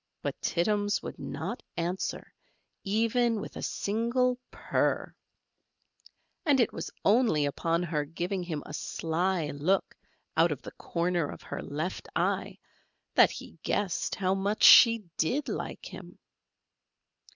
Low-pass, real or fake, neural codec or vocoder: 7.2 kHz; real; none